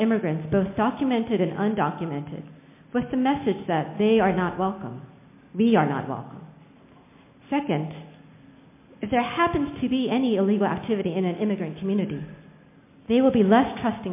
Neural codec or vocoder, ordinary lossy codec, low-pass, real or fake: none; MP3, 24 kbps; 3.6 kHz; real